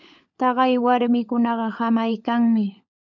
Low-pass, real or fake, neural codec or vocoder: 7.2 kHz; fake; codec, 16 kHz, 16 kbps, FunCodec, trained on LibriTTS, 50 frames a second